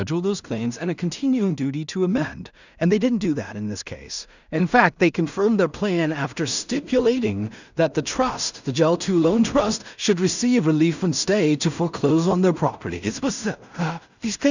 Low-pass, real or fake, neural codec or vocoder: 7.2 kHz; fake; codec, 16 kHz in and 24 kHz out, 0.4 kbps, LongCat-Audio-Codec, two codebook decoder